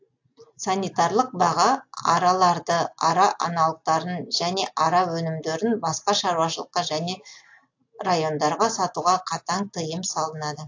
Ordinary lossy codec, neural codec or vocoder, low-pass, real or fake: AAC, 48 kbps; none; 7.2 kHz; real